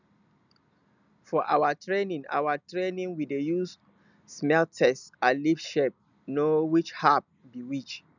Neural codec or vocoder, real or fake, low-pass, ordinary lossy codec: none; real; 7.2 kHz; none